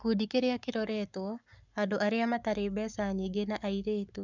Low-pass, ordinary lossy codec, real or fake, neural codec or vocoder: 7.2 kHz; none; fake; codec, 44.1 kHz, 7.8 kbps, Pupu-Codec